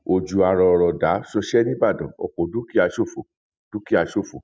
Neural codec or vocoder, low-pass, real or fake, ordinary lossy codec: none; none; real; none